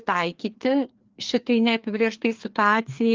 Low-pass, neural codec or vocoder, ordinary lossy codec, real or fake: 7.2 kHz; codec, 16 kHz, 2 kbps, FreqCodec, larger model; Opus, 32 kbps; fake